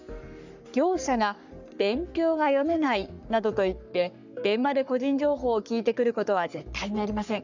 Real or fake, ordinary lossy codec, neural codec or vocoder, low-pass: fake; none; codec, 44.1 kHz, 3.4 kbps, Pupu-Codec; 7.2 kHz